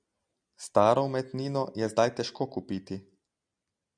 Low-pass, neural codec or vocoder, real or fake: 9.9 kHz; none; real